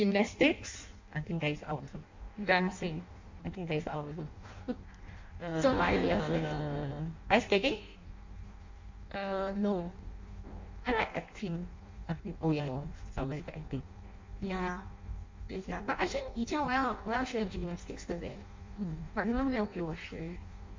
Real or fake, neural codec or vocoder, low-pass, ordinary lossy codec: fake; codec, 16 kHz in and 24 kHz out, 0.6 kbps, FireRedTTS-2 codec; 7.2 kHz; MP3, 48 kbps